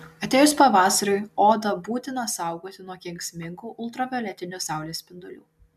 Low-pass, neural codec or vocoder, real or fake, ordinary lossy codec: 14.4 kHz; none; real; MP3, 96 kbps